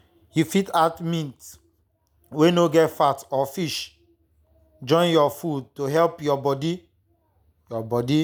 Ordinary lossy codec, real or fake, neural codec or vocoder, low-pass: none; real; none; none